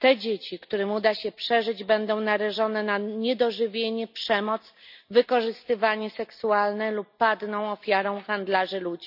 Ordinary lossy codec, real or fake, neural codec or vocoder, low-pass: none; real; none; 5.4 kHz